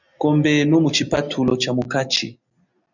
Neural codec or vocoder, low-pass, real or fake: none; 7.2 kHz; real